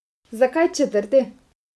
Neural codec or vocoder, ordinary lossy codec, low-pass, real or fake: vocoder, 24 kHz, 100 mel bands, Vocos; none; none; fake